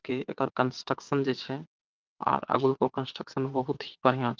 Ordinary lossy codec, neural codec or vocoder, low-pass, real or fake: Opus, 24 kbps; vocoder, 22.05 kHz, 80 mel bands, WaveNeXt; 7.2 kHz; fake